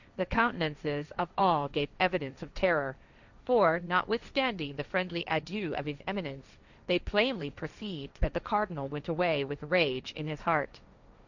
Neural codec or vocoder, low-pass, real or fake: codec, 16 kHz, 1.1 kbps, Voila-Tokenizer; 7.2 kHz; fake